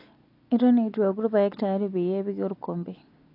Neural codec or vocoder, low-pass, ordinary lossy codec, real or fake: none; 5.4 kHz; AAC, 32 kbps; real